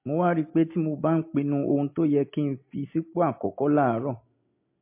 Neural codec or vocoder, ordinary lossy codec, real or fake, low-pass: none; MP3, 24 kbps; real; 3.6 kHz